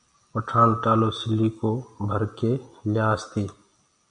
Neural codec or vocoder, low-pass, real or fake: none; 9.9 kHz; real